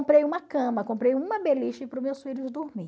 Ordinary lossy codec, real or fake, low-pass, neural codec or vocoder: none; real; none; none